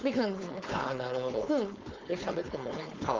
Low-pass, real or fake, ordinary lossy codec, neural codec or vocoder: 7.2 kHz; fake; Opus, 24 kbps; codec, 16 kHz, 4.8 kbps, FACodec